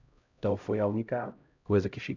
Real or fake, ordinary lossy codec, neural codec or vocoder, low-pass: fake; none; codec, 16 kHz, 0.5 kbps, X-Codec, HuBERT features, trained on LibriSpeech; 7.2 kHz